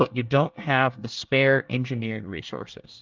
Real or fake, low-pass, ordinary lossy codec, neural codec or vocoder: fake; 7.2 kHz; Opus, 32 kbps; codec, 24 kHz, 1 kbps, SNAC